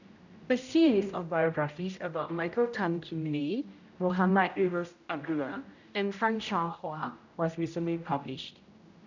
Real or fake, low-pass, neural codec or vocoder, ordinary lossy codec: fake; 7.2 kHz; codec, 16 kHz, 0.5 kbps, X-Codec, HuBERT features, trained on general audio; none